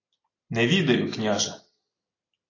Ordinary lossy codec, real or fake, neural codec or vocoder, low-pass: AAC, 32 kbps; real; none; 7.2 kHz